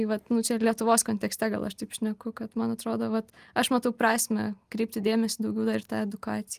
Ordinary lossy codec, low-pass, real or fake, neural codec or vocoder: Opus, 32 kbps; 14.4 kHz; real; none